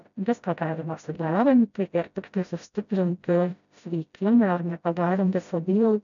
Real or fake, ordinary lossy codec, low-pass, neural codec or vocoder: fake; MP3, 96 kbps; 7.2 kHz; codec, 16 kHz, 0.5 kbps, FreqCodec, smaller model